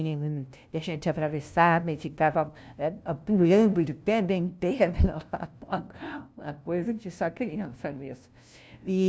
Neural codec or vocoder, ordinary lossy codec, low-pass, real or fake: codec, 16 kHz, 0.5 kbps, FunCodec, trained on LibriTTS, 25 frames a second; none; none; fake